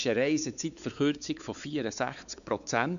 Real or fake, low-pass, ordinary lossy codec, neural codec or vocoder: fake; 7.2 kHz; none; codec, 16 kHz, 4 kbps, X-Codec, WavLM features, trained on Multilingual LibriSpeech